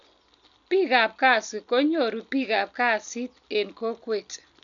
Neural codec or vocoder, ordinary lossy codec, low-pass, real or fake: none; none; 7.2 kHz; real